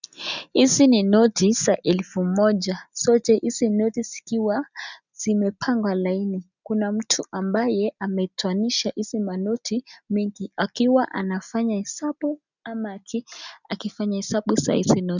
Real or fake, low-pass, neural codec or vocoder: real; 7.2 kHz; none